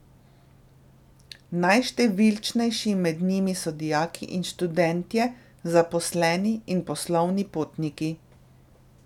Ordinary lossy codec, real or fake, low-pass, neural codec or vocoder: none; real; 19.8 kHz; none